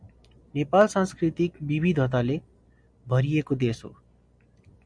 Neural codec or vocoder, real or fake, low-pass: none; real; 9.9 kHz